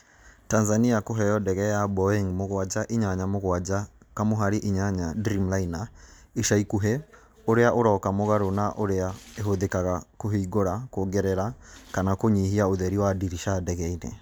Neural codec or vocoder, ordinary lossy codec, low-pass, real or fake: none; none; none; real